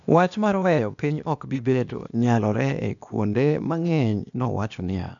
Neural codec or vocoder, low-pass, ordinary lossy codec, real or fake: codec, 16 kHz, 0.8 kbps, ZipCodec; 7.2 kHz; none; fake